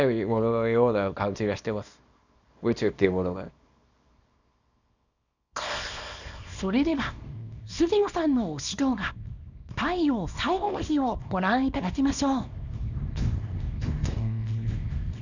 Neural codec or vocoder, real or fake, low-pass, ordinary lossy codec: codec, 24 kHz, 0.9 kbps, WavTokenizer, small release; fake; 7.2 kHz; none